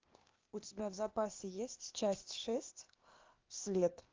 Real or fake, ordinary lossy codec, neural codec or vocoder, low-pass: fake; Opus, 24 kbps; codec, 16 kHz, 0.8 kbps, ZipCodec; 7.2 kHz